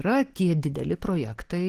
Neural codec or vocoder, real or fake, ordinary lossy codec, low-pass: none; real; Opus, 16 kbps; 14.4 kHz